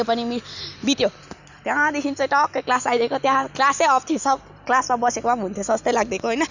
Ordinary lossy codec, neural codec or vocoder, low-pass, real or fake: none; none; 7.2 kHz; real